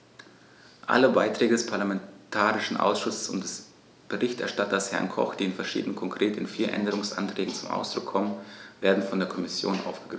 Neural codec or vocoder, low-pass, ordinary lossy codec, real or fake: none; none; none; real